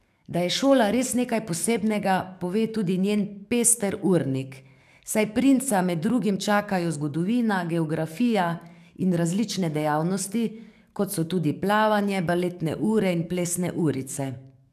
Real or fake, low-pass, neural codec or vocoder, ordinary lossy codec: fake; 14.4 kHz; codec, 44.1 kHz, 7.8 kbps, DAC; none